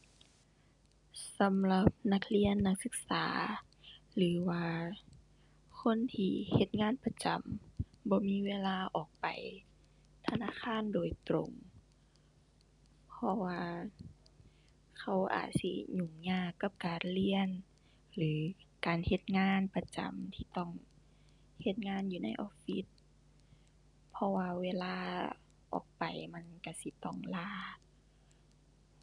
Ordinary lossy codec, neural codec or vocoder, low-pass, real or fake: none; none; 10.8 kHz; real